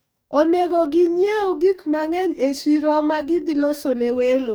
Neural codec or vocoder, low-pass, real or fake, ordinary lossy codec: codec, 44.1 kHz, 2.6 kbps, DAC; none; fake; none